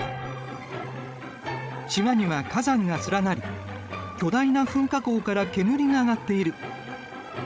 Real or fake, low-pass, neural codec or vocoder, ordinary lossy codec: fake; none; codec, 16 kHz, 16 kbps, FreqCodec, larger model; none